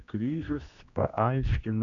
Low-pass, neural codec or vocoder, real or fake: 7.2 kHz; codec, 16 kHz, 1 kbps, X-Codec, HuBERT features, trained on general audio; fake